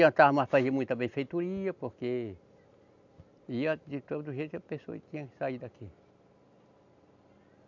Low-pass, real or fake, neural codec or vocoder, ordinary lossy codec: 7.2 kHz; real; none; none